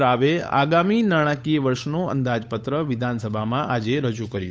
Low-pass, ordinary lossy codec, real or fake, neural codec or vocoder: none; none; fake; codec, 16 kHz, 8 kbps, FunCodec, trained on Chinese and English, 25 frames a second